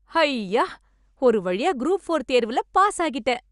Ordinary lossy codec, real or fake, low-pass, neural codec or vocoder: Opus, 64 kbps; real; 10.8 kHz; none